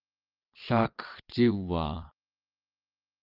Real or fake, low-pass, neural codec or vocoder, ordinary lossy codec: fake; 5.4 kHz; vocoder, 22.05 kHz, 80 mel bands, WaveNeXt; Opus, 32 kbps